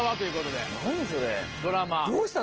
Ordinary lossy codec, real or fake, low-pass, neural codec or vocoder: Opus, 16 kbps; real; 7.2 kHz; none